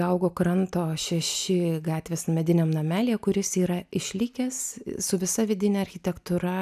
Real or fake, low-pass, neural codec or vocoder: real; 14.4 kHz; none